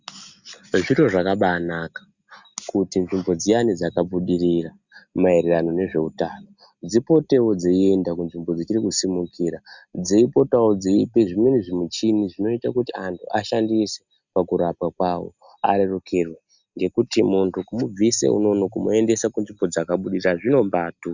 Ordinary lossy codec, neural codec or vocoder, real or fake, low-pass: Opus, 64 kbps; none; real; 7.2 kHz